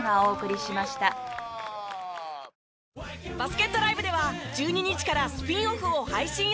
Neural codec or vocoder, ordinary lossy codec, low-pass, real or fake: none; none; none; real